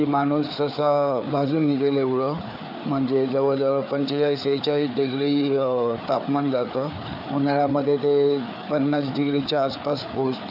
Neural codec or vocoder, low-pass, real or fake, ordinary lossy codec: codec, 16 kHz, 4 kbps, FreqCodec, larger model; 5.4 kHz; fake; none